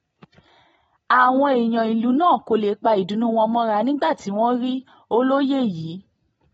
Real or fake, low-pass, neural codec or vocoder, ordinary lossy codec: real; 10.8 kHz; none; AAC, 24 kbps